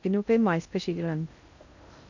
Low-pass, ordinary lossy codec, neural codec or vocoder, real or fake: 7.2 kHz; none; codec, 16 kHz in and 24 kHz out, 0.6 kbps, FocalCodec, streaming, 2048 codes; fake